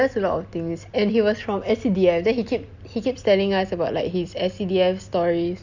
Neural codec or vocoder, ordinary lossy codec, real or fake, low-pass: none; Opus, 64 kbps; real; 7.2 kHz